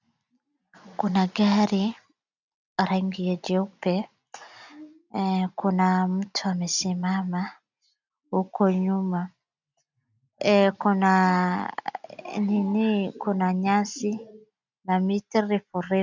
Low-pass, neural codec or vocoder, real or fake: 7.2 kHz; none; real